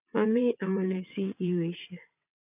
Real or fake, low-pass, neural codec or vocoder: fake; 3.6 kHz; vocoder, 22.05 kHz, 80 mel bands, WaveNeXt